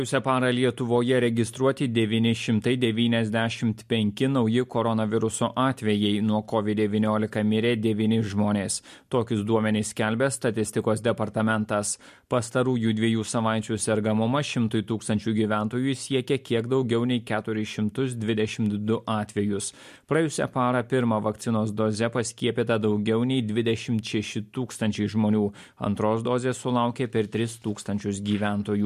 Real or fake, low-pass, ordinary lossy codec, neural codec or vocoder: real; 14.4 kHz; MP3, 64 kbps; none